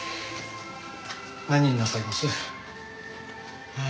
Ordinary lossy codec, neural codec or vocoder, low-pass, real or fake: none; none; none; real